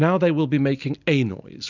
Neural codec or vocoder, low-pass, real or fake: none; 7.2 kHz; real